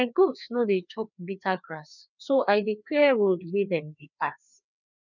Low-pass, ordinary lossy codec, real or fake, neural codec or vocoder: 7.2 kHz; none; fake; codec, 16 kHz, 2 kbps, FreqCodec, larger model